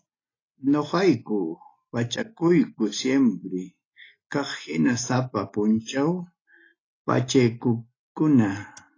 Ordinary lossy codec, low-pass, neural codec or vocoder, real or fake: AAC, 32 kbps; 7.2 kHz; none; real